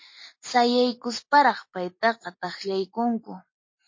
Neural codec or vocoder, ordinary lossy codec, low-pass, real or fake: none; MP3, 32 kbps; 7.2 kHz; real